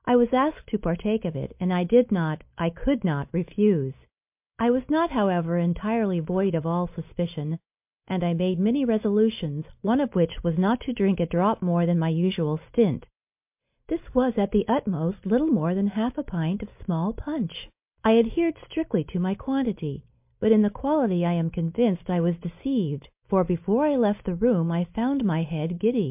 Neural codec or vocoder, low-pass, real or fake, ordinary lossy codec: none; 3.6 kHz; real; MP3, 32 kbps